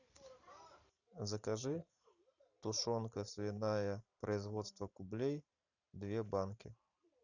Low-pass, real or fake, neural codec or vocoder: 7.2 kHz; fake; vocoder, 44.1 kHz, 128 mel bands every 512 samples, BigVGAN v2